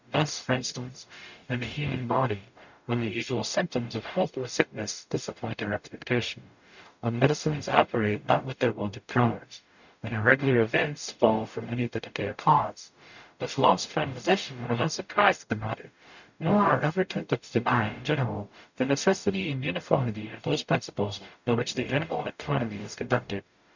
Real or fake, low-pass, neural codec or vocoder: fake; 7.2 kHz; codec, 44.1 kHz, 0.9 kbps, DAC